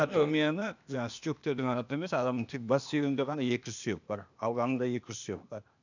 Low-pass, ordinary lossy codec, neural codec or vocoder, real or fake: 7.2 kHz; none; codec, 16 kHz, 0.8 kbps, ZipCodec; fake